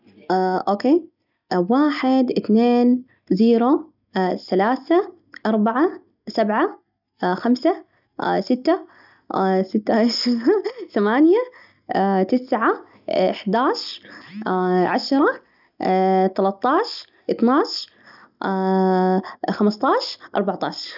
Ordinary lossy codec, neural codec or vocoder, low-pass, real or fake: none; none; 5.4 kHz; real